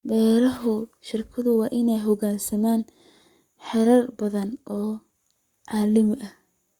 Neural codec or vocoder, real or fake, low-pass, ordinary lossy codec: codec, 44.1 kHz, 7.8 kbps, Pupu-Codec; fake; 19.8 kHz; Opus, 64 kbps